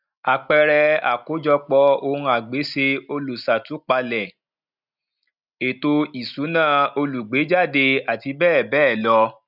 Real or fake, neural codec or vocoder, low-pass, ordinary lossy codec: real; none; 5.4 kHz; none